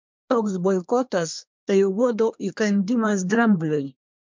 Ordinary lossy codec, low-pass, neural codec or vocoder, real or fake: MP3, 64 kbps; 7.2 kHz; codec, 24 kHz, 1 kbps, SNAC; fake